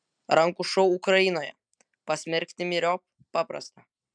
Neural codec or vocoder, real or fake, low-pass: none; real; 9.9 kHz